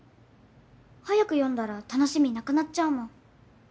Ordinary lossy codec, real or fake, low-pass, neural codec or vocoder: none; real; none; none